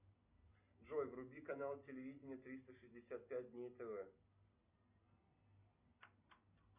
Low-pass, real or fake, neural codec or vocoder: 3.6 kHz; real; none